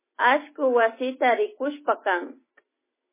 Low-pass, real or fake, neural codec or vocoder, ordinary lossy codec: 3.6 kHz; real; none; MP3, 16 kbps